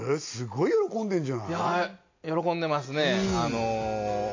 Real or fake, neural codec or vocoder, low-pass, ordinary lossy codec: real; none; 7.2 kHz; AAC, 48 kbps